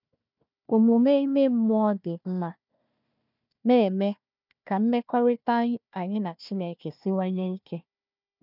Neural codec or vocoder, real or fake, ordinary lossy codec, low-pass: codec, 16 kHz, 1 kbps, FunCodec, trained on Chinese and English, 50 frames a second; fake; none; 5.4 kHz